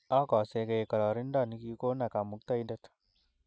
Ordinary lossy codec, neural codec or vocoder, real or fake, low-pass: none; none; real; none